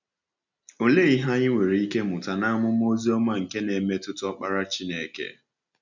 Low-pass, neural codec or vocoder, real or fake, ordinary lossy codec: 7.2 kHz; none; real; none